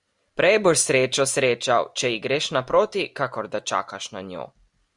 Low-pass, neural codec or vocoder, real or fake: 10.8 kHz; none; real